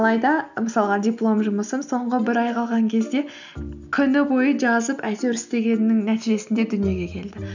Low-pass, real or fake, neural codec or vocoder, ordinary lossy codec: 7.2 kHz; real; none; none